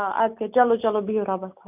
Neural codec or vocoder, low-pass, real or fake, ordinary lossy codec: none; 3.6 kHz; real; none